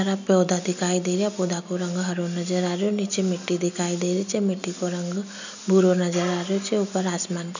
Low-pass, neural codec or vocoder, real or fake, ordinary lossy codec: 7.2 kHz; none; real; none